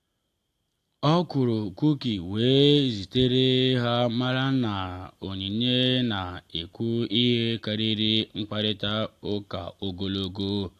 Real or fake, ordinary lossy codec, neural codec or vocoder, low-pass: real; AAC, 64 kbps; none; 14.4 kHz